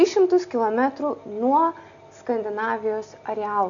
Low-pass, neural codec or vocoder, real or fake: 7.2 kHz; none; real